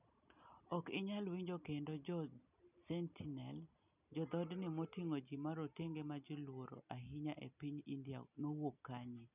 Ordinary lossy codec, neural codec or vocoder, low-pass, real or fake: none; none; 3.6 kHz; real